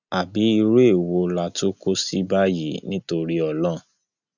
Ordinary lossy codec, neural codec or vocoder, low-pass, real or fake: none; none; 7.2 kHz; real